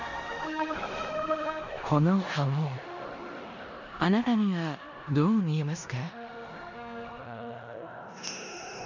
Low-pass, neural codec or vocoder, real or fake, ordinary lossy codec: 7.2 kHz; codec, 16 kHz in and 24 kHz out, 0.9 kbps, LongCat-Audio-Codec, four codebook decoder; fake; none